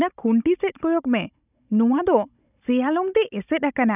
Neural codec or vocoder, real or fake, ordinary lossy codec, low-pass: codec, 16 kHz, 16 kbps, FunCodec, trained on Chinese and English, 50 frames a second; fake; none; 3.6 kHz